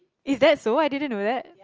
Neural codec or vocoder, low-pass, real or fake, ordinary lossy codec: none; 7.2 kHz; real; Opus, 24 kbps